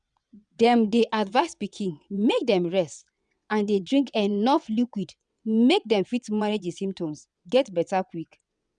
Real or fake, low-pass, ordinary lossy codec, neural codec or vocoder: fake; 9.9 kHz; none; vocoder, 22.05 kHz, 80 mel bands, WaveNeXt